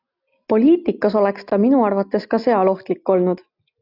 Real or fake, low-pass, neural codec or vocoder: real; 5.4 kHz; none